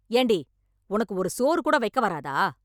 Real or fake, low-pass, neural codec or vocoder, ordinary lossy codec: real; none; none; none